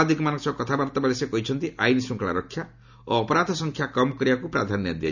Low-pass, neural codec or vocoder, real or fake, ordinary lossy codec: 7.2 kHz; none; real; none